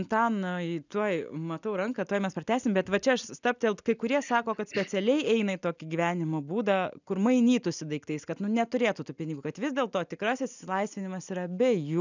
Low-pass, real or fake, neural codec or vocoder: 7.2 kHz; real; none